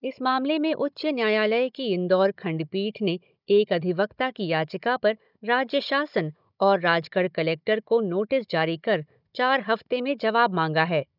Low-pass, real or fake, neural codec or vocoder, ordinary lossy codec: 5.4 kHz; fake; codec, 16 kHz, 16 kbps, FunCodec, trained on Chinese and English, 50 frames a second; none